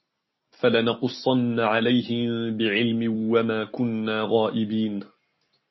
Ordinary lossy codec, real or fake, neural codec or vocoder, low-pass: MP3, 24 kbps; real; none; 7.2 kHz